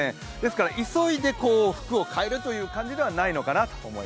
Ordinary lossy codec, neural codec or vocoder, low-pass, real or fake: none; none; none; real